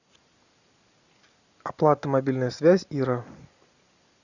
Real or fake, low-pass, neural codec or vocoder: real; 7.2 kHz; none